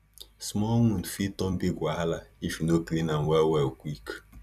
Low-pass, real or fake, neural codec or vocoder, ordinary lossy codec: 14.4 kHz; fake; vocoder, 44.1 kHz, 128 mel bands every 256 samples, BigVGAN v2; none